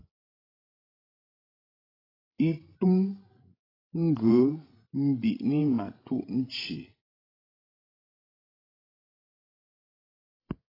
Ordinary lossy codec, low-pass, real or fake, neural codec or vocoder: AAC, 24 kbps; 5.4 kHz; fake; codec, 16 kHz, 16 kbps, FreqCodec, larger model